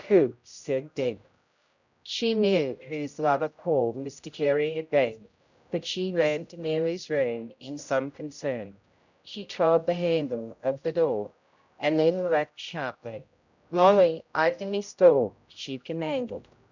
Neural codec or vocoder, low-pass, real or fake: codec, 16 kHz, 0.5 kbps, X-Codec, HuBERT features, trained on general audio; 7.2 kHz; fake